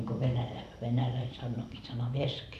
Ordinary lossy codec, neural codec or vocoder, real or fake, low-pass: none; vocoder, 44.1 kHz, 128 mel bands every 512 samples, BigVGAN v2; fake; 14.4 kHz